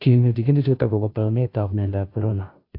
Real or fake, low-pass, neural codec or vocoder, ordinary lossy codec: fake; 5.4 kHz; codec, 16 kHz, 0.5 kbps, FunCodec, trained on Chinese and English, 25 frames a second; AAC, 32 kbps